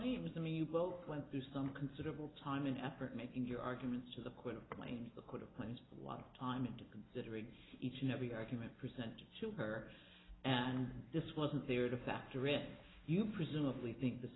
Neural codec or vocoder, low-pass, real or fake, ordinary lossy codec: none; 7.2 kHz; real; AAC, 16 kbps